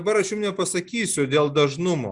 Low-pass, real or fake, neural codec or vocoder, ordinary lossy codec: 10.8 kHz; real; none; Opus, 64 kbps